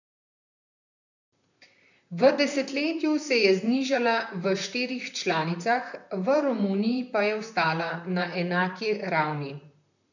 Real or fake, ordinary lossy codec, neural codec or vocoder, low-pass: fake; none; vocoder, 44.1 kHz, 128 mel bands, Pupu-Vocoder; 7.2 kHz